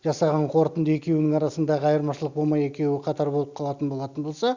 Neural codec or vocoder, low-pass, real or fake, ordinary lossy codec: none; 7.2 kHz; real; Opus, 64 kbps